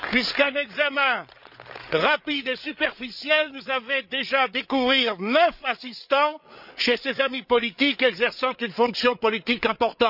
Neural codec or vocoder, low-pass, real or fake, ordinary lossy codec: codec, 16 kHz, 8 kbps, FreqCodec, larger model; 5.4 kHz; fake; none